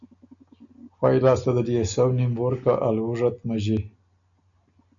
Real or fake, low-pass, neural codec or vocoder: real; 7.2 kHz; none